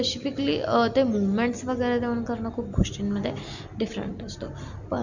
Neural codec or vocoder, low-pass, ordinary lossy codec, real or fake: none; 7.2 kHz; none; real